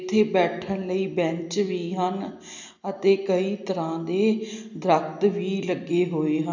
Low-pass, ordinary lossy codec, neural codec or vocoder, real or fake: 7.2 kHz; none; none; real